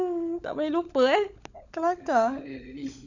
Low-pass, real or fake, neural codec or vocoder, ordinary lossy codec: 7.2 kHz; fake; codec, 16 kHz, 16 kbps, FunCodec, trained on LibriTTS, 50 frames a second; none